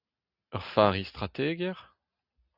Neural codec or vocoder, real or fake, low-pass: none; real; 5.4 kHz